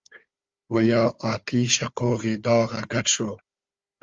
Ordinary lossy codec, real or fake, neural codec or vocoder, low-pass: Opus, 32 kbps; fake; codec, 16 kHz, 4 kbps, FunCodec, trained on Chinese and English, 50 frames a second; 7.2 kHz